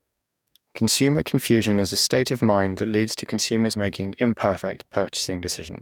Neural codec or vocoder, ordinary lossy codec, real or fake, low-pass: codec, 44.1 kHz, 2.6 kbps, DAC; none; fake; 19.8 kHz